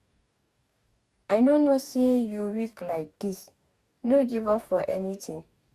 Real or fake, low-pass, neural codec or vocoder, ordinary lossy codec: fake; 14.4 kHz; codec, 44.1 kHz, 2.6 kbps, DAC; Opus, 64 kbps